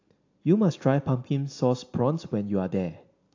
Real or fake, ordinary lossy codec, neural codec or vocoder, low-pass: real; AAC, 48 kbps; none; 7.2 kHz